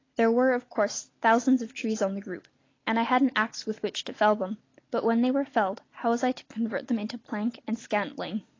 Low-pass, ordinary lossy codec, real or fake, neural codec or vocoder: 7.2 kHz; AAC, 32 kbps; real; none